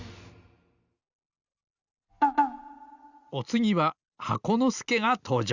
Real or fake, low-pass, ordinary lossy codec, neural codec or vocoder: real; 7.2 kHz; Opus, 64 kbps; none